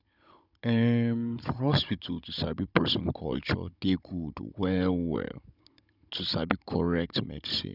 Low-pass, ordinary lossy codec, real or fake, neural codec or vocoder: 5.4 kHz; none; fake; codec, 16 kHz, 16 kbps, FunCodec, trained on Chinese and English, 50 frames a second